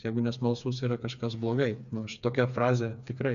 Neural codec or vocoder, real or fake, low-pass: codec, 16 kHz, 4 kbps, FreqCodec, smaller model; fake; 7.2 kHz